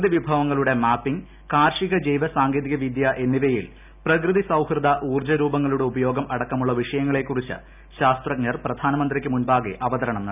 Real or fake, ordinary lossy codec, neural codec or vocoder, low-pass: real; none; none; 3.6 kHz